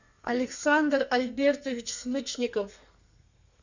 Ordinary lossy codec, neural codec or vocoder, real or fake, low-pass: Opus, 64 kbps; codec, 32 kHz, 1.9 kbps, SNAC; fake; 7.2 kHz